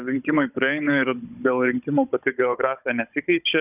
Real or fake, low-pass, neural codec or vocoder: fake; 3.6 kHz; codec, 16 kHz, 16 kbps, FunCodec, trained on Chinese and English, 50 frames a second